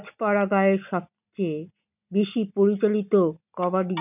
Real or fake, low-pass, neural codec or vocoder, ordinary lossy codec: real; 3.6 kHz; none; none